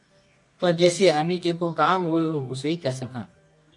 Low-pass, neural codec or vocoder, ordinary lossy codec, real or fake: 10.8 kHz; codec, 24 kHz, 0.9 kbps, WavTokenizer, medium music audio release; MP3, 48 kbps; fake